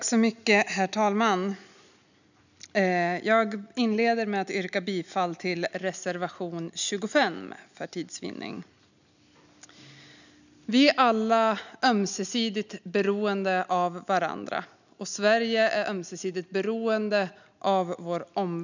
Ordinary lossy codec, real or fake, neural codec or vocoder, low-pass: none; real; none; 7.2 kHz